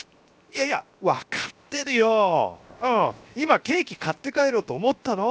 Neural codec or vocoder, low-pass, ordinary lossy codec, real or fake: codec, 16 kHz, 0.7 kbps, FocalCodec; none; none; fake